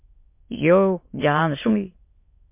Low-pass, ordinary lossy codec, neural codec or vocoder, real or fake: 3.6 kHz; MP3, 24 kbps; autoencoder, 22.05 kHz, a latent of 192 numbers a frame, VITS, trained on many speakers; fake